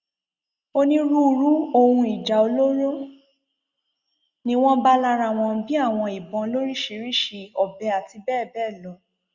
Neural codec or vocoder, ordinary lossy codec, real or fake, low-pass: none; Opus, 64 kbps; real; 7.2 kHz